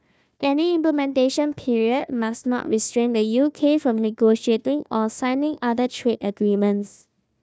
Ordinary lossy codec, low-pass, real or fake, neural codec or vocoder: none; none; fake; codec, 16 kHz, 1 kbps, FunCodec, trained on Chinese and English, 50 frames a second